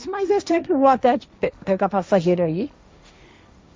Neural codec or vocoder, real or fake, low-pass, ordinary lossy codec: codec, 16 kHz, 1.1 kbps, Voila-Tokenizer; fake; none; none